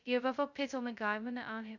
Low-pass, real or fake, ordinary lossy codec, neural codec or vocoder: 7.2 kHz; fake; none; codec, 16 kHz, 0.2 kbps, FocalCodec